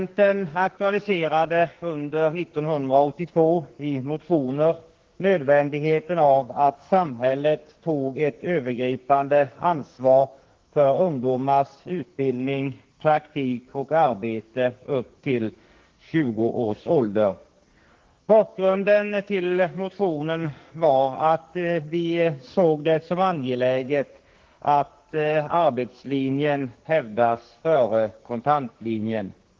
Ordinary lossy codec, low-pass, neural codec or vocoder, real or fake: Opus, 16 kbps; 7.2 kHz; codec, 44.1 kHz, 2.6 kbps, SNAC; fake